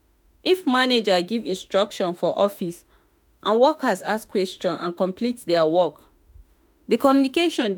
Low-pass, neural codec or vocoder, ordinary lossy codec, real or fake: none; autoencoder, 48 kHz, 32 numbers a frame, DAC-VAE, trained on Japanese speech; none; fake